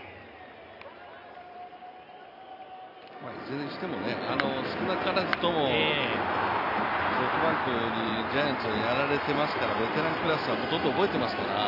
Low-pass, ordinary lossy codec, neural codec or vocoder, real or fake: 5.4 kHz; none; none; real